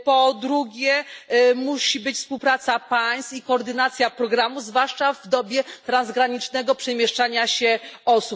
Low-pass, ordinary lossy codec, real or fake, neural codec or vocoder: none; none; real; none